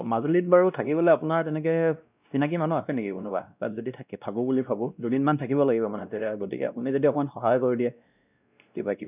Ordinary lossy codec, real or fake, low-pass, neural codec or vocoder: none; fake; 3.6 kHz; codec, 16 kHz, 1 kbps, X-Codec, WavLM features, trained on Multilingual LibriSpeech